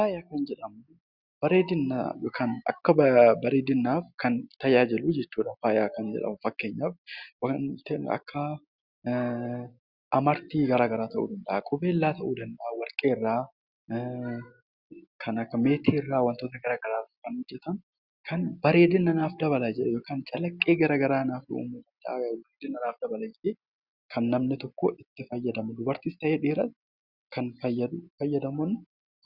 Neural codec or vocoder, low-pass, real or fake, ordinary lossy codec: none; 5.4 kHz; real; Opus, 64 kbps